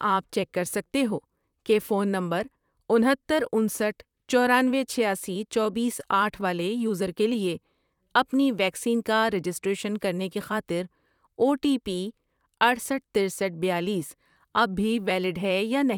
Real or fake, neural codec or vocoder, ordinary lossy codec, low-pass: fake; vocoder, 44.1 kHz, 128 mel bands, Pupu-Vocoder; none; 19.8 kHz